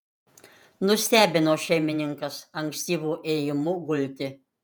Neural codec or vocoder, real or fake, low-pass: vocoder, 48 kHz, 128 mel bands, Vocos; fake; 19.8 kHz